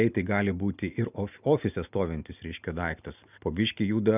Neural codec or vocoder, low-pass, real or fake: none; 3.6 kHz; real